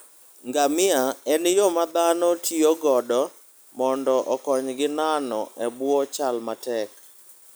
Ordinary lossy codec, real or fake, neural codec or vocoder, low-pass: none; real; none; none